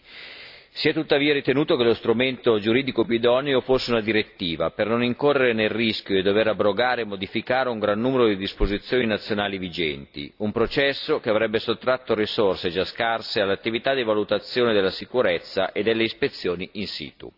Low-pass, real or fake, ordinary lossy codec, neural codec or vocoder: 5.4 kHz; real; AAC, 48 kbps; none